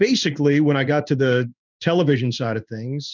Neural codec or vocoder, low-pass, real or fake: codec, 16 kHz in and 24 kHz out, 1 kbps, XY-Tokenizer; 7.2 kHz; fake